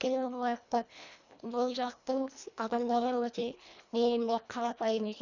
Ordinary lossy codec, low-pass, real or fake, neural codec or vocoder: none; 7.2 kHz; fake; codec, 24 kHz, 1.5 kbps, HILCodec